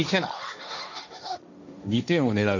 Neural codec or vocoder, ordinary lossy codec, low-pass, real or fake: codec, 16 kHz, 1.1 kbps, Voila-Tokenizer; none; 7.2 kHz; fake